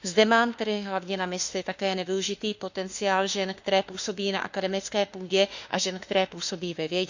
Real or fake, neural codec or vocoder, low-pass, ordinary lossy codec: fake; autoencoder, 48 kHz, 32 numbers a frame, DAC-VAE, trained on Japanese speech; 7.2 kHz; Opus, 64 kbps